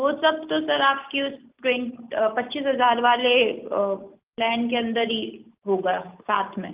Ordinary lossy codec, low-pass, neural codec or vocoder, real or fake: Opus, 32 kbps; 3.6 kHz; none; real